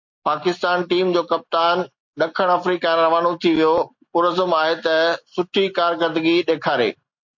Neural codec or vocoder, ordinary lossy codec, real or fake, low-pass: none; MP3, 48 kbps; real; 7.2 kHz